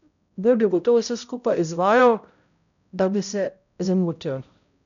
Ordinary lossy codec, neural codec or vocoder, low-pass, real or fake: none; codec, 16 kHz, 0.5 kbps, X-Codec, HuBERT features, trained on balanced general audio; 7.2 kHz; fake